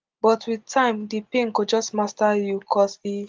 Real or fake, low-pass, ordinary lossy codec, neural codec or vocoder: real; 7.2 kHz; Opus, 32 kbps; none